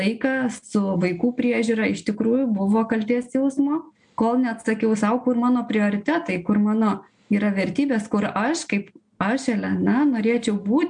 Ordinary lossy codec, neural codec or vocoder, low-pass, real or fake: MP3, 64 kbps; none; 9.9 kHz; real